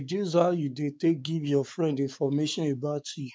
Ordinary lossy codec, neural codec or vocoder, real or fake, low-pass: none; codec, 16 kHz, 4 kbps, X-Codec, WavLM features, trained on Multilingual LibriSpeech; fake; none